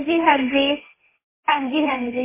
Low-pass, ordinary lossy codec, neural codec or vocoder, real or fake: 3.6 kHz; MP3, 16 kbps; codec, 16 kHz, 1.1 kbps, Voila-Tokenizer; fake